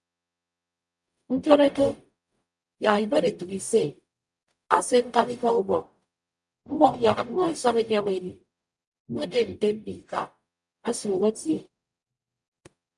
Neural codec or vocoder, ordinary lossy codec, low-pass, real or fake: codec, 44.1 kHz, 0.9 kbps, DAC; MP3, 96 kbps; 10.8 kHz; fake